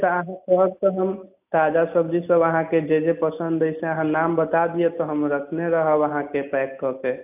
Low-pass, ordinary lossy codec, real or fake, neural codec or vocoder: 3.6 kHz; AAC, 32 kbps; real; none